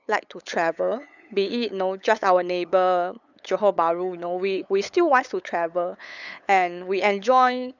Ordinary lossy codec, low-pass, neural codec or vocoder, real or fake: none; 7.2 kHz; codec, 16 kHz, 8 kbps, FunCodec, trained on LibriTTS, 25 frames a second; fake